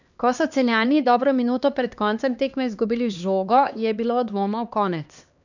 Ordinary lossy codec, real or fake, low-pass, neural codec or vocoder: none; fake; 7.2 kHz; codec, 16 kHz, 2 kbps, X-Codec, HuBERT features, trained on LibriSpeech